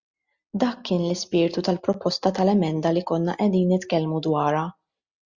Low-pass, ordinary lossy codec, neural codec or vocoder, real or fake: 7.2 kHz; Opus, 64 kbps; none; real